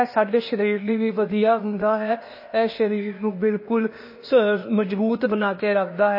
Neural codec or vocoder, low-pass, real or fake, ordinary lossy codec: codec, 16 kHz, 0.8 kbps, ZipCodec; 5.4 kHz; fake; MP3, 24 kbps